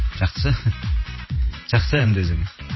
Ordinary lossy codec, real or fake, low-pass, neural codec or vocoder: MP3, 24 kbps; fake; 7.2 kHz; vocoder, 44.1 kHz, 128 mel bands every 512 samples, BigVGAN v2